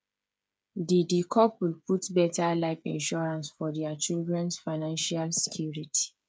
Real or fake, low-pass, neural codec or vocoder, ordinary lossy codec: fake; none; codec, 16 kHz, 8 kbps, FreqCodec, smaller model; none